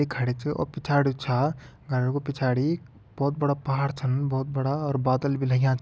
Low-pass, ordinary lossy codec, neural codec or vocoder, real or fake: none; none; none; real